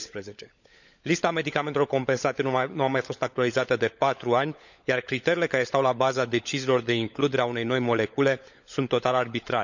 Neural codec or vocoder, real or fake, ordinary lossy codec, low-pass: codec, 16 kHz, 16 kbps, FunCodec, trained on LibriTTS, 50 frames a second; fake; none; 7.2 kHz